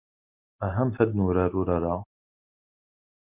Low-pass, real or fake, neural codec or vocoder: 3.6 kHz; real; none